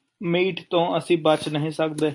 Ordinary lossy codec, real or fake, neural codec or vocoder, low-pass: AAC, 64 kbps; real; none; 10.8 kHz